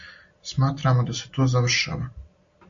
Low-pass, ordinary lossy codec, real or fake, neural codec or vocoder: 7.2 kHz; AAC, 64 kbps; real; none